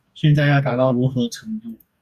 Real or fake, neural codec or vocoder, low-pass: fake; codec, 44.1 kHz, 2.6 kbps, DAC; 14.4 kHz